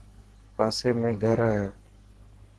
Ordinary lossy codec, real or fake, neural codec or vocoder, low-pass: Opus, 16 kbps; fake; codec, 44.1 kHz, 2.6 kbps, SNAC; 10.8 kHz